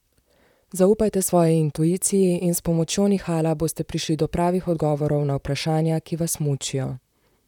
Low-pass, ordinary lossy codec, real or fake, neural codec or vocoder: 19.8 kHz; none; fake; vocoder, 44.1 kHz, 128 mel bands, Pupu-Vocoder